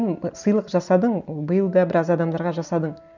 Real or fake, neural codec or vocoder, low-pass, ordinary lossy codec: real; none; 7.2 kHz; none